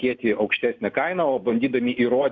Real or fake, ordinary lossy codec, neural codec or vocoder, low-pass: real; MP3, 64 kbps; none; 7.2 kHz